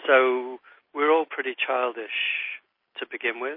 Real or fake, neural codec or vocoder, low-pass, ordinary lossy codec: real; none; 5.4 kHz; MP3, 24 kbps